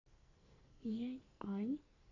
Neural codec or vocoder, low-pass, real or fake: codec, 44.1 kHz, 2.6 kbps, SNAC; 7.2 kHz; fake